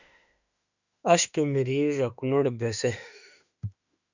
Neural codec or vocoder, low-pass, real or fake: autoencoder, 48 kHz, 32 numbers a frame, DAC-VAE, trained on Japanese speech; 7.2 kHz; fake